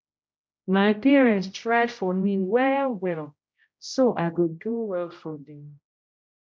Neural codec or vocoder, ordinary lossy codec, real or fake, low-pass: codec, 16 kHz, 0.5 kbps, X-Codec, HuBERT features, trained on general audio; none; fake; none